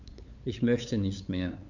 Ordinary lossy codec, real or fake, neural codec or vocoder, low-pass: none; fake; codec, 16 kHz, 8 kbps, FunCodec, trained on LibriTTS, 25 frames a second; 7.2 kHz